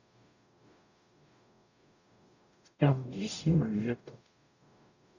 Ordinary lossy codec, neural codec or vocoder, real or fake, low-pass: none; codec, 44.1 kHz, 0.9 kbps, DAC; fake; 7.2 kHz